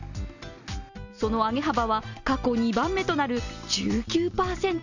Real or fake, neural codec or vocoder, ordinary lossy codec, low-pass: real; none; none; 7.2 kHz